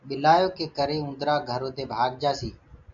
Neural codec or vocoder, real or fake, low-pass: none; real; 7.2 kHz